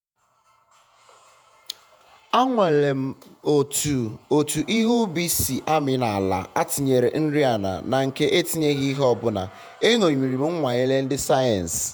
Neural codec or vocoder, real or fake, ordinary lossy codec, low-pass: vocoder, 48 kHz, 128 mel bands, Vocos; fake; none; none